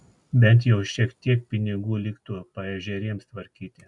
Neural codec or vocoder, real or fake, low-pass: none; real; 10.8 kHz